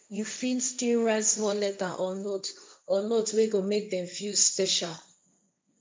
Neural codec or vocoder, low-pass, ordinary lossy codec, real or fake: codec, 16 kHz, 1.1 kbps, Voila-Tokenizer; none; none; fake